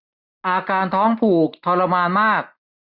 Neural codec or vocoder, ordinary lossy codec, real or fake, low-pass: none; none; real; 5.4 kHz